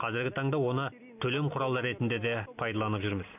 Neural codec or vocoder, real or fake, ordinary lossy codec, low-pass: none; real; none; 3.6 kHz